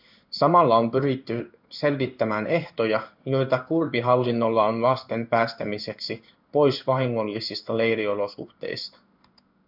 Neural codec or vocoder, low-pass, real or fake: codec, 16 kHz in and 24 kHz out, 1 kbps, XY-Tokenizer; 5.4 kHz; fake